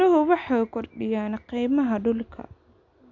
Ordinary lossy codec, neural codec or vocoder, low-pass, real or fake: none; none; 7.2 kHz; real